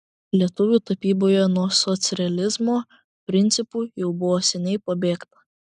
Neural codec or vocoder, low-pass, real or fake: none; 10.8 kHz; real